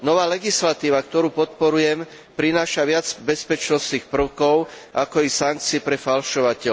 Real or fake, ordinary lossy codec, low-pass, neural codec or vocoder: real; none; none; none